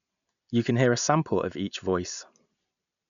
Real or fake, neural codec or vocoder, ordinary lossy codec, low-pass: real; none; none; 7.2 kHz